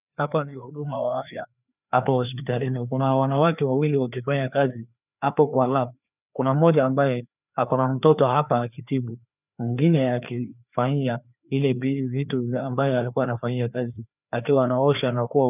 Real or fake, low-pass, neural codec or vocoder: fake; 3.6 kHz; codec, 16 kHz, 2 kbps, FreqCodec, larger model